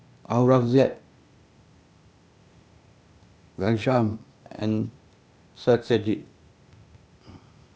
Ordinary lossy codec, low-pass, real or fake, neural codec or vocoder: none; none; fake; codec, 16 kHz, 0.8 kbps, ZipCodec